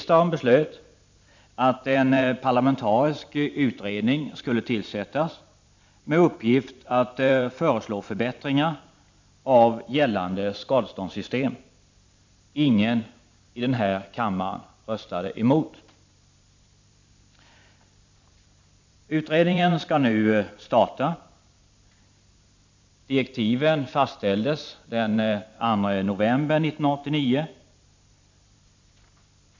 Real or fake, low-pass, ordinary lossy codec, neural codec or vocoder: fake; 7.2 kHz; MP3, 64 kbps; vocoder, 44.1 kHz, 128 mel bands every 512 samples, BigVGAN v2